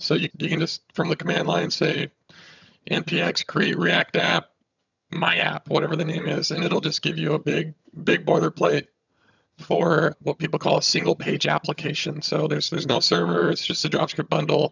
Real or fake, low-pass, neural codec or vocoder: fake; 7.2 kHz; vocoder, 22.05 kHz, 80 mel bands, HiFi-GAN